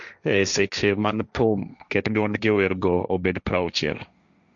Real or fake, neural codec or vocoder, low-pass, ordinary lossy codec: fake; codec, 16 kHz, 1.1 kbps, Voila-Tokenizer; 7.2 kHz; none